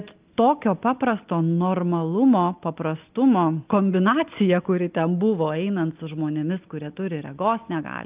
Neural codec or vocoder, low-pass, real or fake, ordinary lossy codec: none; 3.6 kHz; real; Opus, 24 kbps